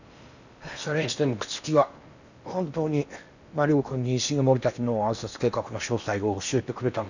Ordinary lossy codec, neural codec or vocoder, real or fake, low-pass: none; codec, 16 kHz in and 24 kHz out, 0.8 kbps, FocalCodec, streaming, 65536 codes; fake; 7.2 kHz